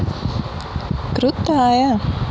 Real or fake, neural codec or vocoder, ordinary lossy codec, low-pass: real; none; none; none